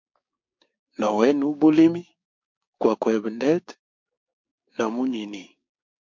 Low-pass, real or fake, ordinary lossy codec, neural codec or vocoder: 7.2 kHz; fake; MP3, 48 kbps; codec, 16 kHz, 6 kbps, DAC